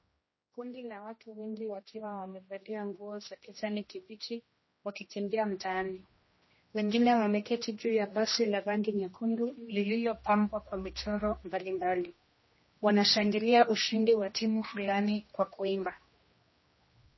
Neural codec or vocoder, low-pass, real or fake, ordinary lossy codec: codec, 16 kHz, 1 kbps, X-Codec, HuBERT features, trained on general audio; 7.2 kHz; fake; MP3, 24 kbps